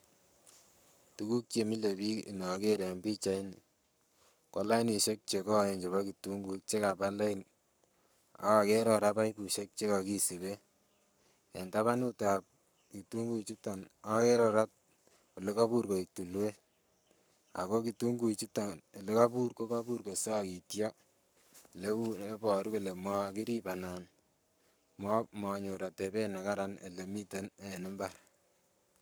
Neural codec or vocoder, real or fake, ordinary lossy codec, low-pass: codec, 44.1 kHz, 7.8 kbps, Pupu-Codec; fake; none; none